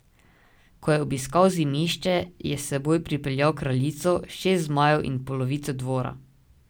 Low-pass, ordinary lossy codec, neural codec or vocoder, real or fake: none; none; none; real